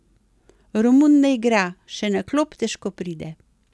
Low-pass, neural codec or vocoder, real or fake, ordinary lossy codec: none; none; real; none